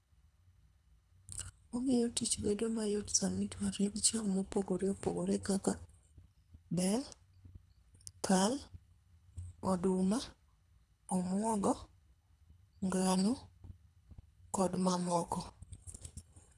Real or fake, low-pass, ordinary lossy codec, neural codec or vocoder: fake; none; none; codec, 24 kHz, 3 kbps, HILCodec